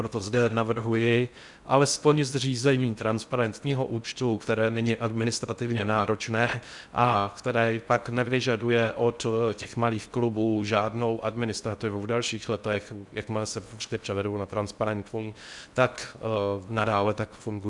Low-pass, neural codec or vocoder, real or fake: 10.8 kHz; codec, 16 kHz in and 24 kHz out, 0.6 kbps, FocalCodec, streaming, 4096 codes; fake